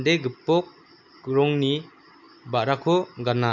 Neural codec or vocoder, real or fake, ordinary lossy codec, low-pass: none; real; none; 7.2 kHz